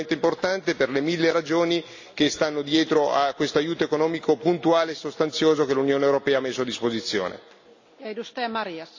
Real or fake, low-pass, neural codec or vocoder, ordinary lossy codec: real; 7.2 kHz; none; AAC, 48 kbps